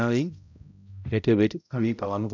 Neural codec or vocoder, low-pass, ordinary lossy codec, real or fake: codec, 16 kHz, 0.5 kbps, X-Codec, HuBERT features, trained on balanced general audio; 7.2 kHz; none; fake